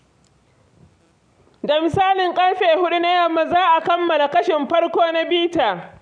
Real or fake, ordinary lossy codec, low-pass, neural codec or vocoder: real; none; 9.9 kHz; none